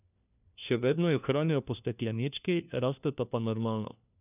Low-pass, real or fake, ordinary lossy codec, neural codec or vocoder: 3.6 kHz; fake; none; codec, 16 kHz, 1 kbps, FunCodec, trained on LibriTTS, 50 frames a second